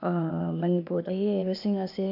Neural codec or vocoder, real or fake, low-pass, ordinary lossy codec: codec, 16 kHz, 0.8 kbps, ZipCodec; fake; 5.4 kHz; none